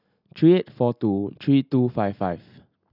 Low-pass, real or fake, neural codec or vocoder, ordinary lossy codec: 5.4 kHz; real; none; none